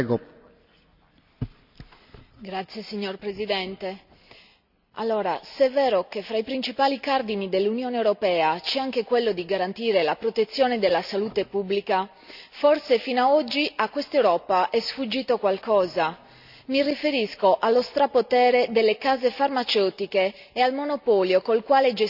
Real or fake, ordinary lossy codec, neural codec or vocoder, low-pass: real; none; none; 5.4 kHz